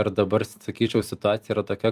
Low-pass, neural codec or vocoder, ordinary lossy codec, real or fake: 14.4 kHz; vocoder, 44.1 kHz, 128 mel bands every 256 samples, BigVGAN v2; Opus, 32 kbps; fake